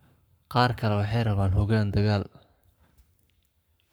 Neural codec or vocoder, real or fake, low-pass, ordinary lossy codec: codec, 44.1 kHz, 7.8 kbps, Pupu-Codec; fake; none; none